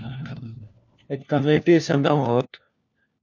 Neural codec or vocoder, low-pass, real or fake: codec, 16 kHz, 1 kbps, FunCodec, trained on LibriTTS, 50 frames a second; 7.2 kHz; fake